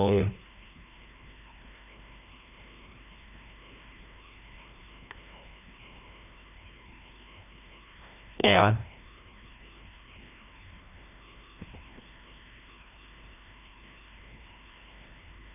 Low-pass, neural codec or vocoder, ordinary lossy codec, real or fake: 3.6 kHz; codec, 16 kHz, 1 kbps, FreqCodec, larger model; none; fake